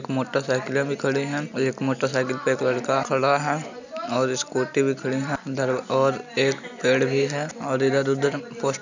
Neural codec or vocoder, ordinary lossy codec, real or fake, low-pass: none; none; real; 7.2 kHz